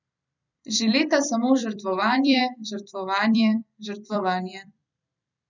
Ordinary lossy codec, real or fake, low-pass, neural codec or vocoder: none; fake; 7.2 kHz; vocoder, 44.1 kHz, 128 mel bands every 256 samples, BigVGAN v2